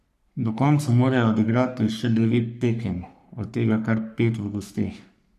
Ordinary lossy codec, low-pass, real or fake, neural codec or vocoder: none; 14.4 kHz; fake; codec, 44.1 kHz, 3.4 kbps, Pupu-Codec